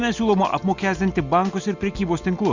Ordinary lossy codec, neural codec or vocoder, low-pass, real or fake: Opus, 64 kbps; none; 7.2 kHz; real